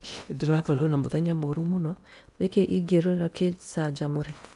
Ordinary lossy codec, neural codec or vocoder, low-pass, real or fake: none; codec, 16 kHz in and 24 kHz out, 0.8 kbps, FocalCodec, streaming, 65536 codes; 10.8 kHz; fake